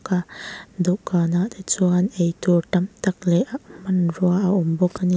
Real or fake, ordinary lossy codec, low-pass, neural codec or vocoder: real; none; none; none